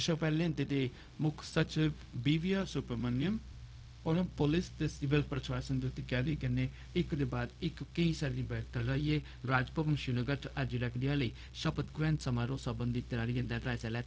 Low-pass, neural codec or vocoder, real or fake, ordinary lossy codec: none; codec, 16 kHz, 0.4 kbps, LongCat-Audio-Codec; fake; none